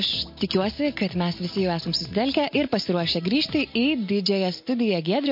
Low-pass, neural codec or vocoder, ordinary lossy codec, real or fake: 5.4 kHz; none; MP3, 32 kbps; real